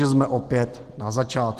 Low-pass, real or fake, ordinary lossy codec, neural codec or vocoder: 14.4 kHz; real; Opus, 16 kbps; none